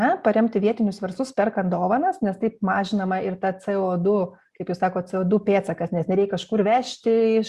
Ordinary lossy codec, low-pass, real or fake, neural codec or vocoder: Opus, 64 kbps; 14.4 kHz; real; none